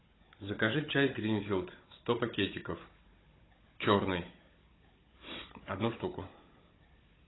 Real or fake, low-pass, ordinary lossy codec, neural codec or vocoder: fake; 7.2 kHz; AAC, 16 kbps; codec, 16 kHz, 16 kbps, FunCodec, trained on Chinese and English, 50 frames a second